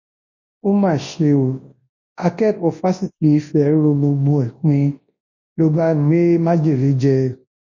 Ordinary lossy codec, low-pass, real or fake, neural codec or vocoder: MP3, 32 kbps; 7.2 kHz; fake; codec, 24 kHz, 0.9 kbps, WavTokenizer, large speech release